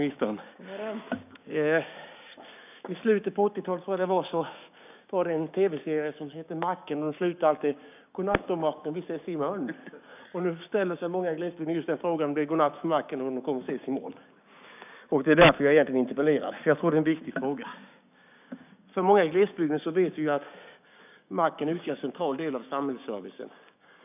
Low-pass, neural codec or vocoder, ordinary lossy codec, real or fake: 3.6 kHz; codec, 16 kHz, 6 kbps, DAC; none; fake